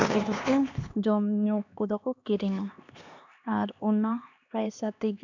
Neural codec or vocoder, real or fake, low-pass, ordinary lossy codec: codec, 16 kHz, 2 kbps, X-Codec, HuBERT features, trained on LibriSpeech; fake; 7.2 kHz; none